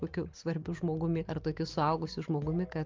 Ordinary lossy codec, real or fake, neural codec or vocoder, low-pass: Opus, 24 kbps; real; none; 7.2 kHz